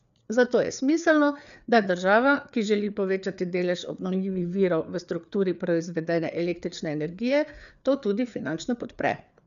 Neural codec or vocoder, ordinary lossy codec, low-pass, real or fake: codec, 16 kHz, 4 kbps, FreqCodec, larger model; none; 7.2 kHz; fake